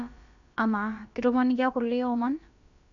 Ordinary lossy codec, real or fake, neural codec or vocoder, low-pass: none; fake; codec, 16 kHz, about 1 kbps, DyCAST, with the encoder's durations; 7.2 kHz